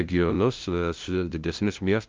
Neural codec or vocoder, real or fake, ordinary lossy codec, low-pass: codec, 16 kHz, 0.5 kbps, FunCodec, trained on LibriTTS, 25 frames a second; fake; Opus, 24 kbps; 7.2 kHz